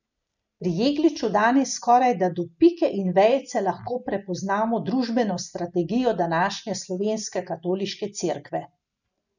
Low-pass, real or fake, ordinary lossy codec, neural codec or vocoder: 7.2 kHz; real; none; none